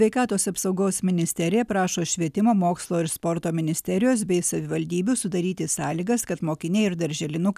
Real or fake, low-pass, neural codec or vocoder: real; 14.4 kHz; none